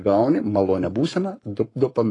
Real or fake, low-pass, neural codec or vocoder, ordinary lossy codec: fake; 10.8 kHz; codec, 44.1 kHz, 7.8 kbps, Pupu-Codec; AAC, 32 kbps